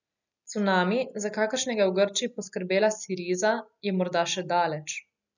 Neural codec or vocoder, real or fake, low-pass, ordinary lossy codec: none; real; 7.2 kHz; none